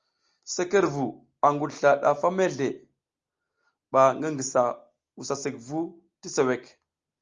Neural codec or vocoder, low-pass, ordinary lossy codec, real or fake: none; 7.2 kHz; Opus, 32 kbps; real